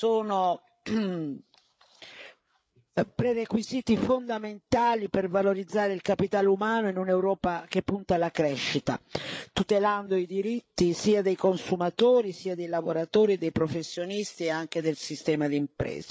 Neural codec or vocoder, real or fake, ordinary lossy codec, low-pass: codec, 16 kHz, 8 kbps, FreqCodec, larger model; fake; none; none